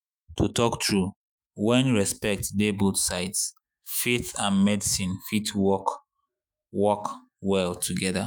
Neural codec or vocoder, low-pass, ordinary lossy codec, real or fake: autoencoder, 48 kHz, 128 numbers a frame, DAC-VAE, trained on Japanese speech; none; none; fake